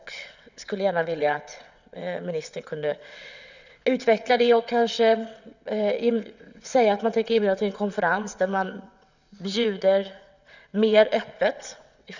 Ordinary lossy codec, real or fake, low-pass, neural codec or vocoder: none; fake; 7.2 kHz; vocoder, 22.05 kHz, 80 mel bands, WaveNeXt